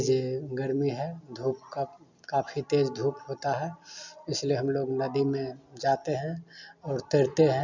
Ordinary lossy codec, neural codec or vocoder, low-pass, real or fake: none; none; 7.2 kHz; real